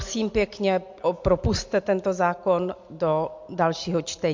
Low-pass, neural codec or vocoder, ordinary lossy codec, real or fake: 7.2 kHz; none; MP3, 48 kbps; real